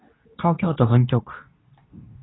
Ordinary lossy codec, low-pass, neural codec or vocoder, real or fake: AAC, 16 kbps; 7.2 kHz; codec, 24 kHz, 0.9 kbps, WavTokenizer, medium speech release version 2; fake